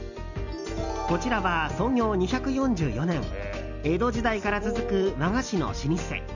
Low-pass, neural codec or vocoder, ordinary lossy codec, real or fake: 7.2 kHz; none; none; real